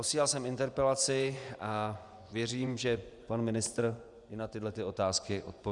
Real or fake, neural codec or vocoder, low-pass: fake; vocoder, 24 kHz, 100 mel bands, Vocos; 10.8 kHz